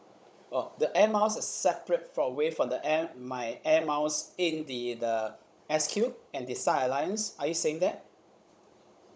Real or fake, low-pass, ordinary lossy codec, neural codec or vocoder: fake; none; none; codec, 16 kHz, 16 kbps, FunCodec, trained on Chinese and English, 50 frames a second